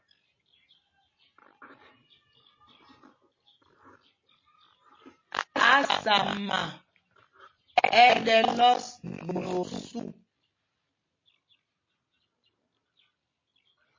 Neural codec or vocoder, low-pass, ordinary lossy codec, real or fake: none; 7.2 kHz; MP3, 32 kbps; real